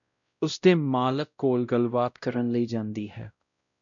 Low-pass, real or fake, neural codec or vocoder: 7.2 kHz; fake; codec, 16 kHz, 0.5 kbps, X-Codec, WavLM features, trained on Multilingual LibriSpeech